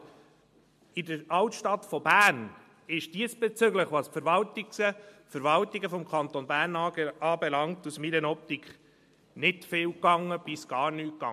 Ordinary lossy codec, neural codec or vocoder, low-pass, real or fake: MP3, 64 kbps; none; 14.4 kHz; real